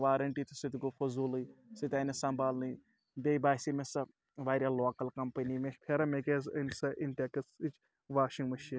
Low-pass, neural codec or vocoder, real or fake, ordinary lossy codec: none; none; real; none